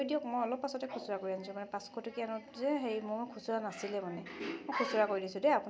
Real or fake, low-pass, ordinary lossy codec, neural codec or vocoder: real; none; none; none